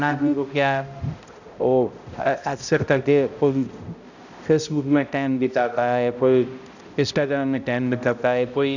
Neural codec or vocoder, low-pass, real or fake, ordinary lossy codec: codec, 16 kHz, 0.5 kbps, X-Codec, HuBERT features, trained on balanced general audio; 7.2 kHz; fake; none